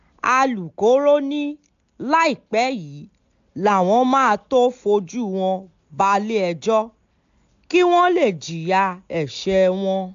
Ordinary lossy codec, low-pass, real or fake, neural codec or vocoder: AAC, 64 kbps; 7.2 kHz; real; none